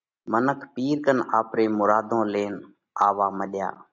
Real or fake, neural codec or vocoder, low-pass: real; none; 7.2 kHz